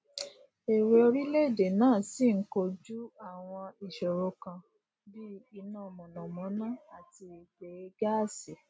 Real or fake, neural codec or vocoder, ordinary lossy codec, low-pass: real; none; none; none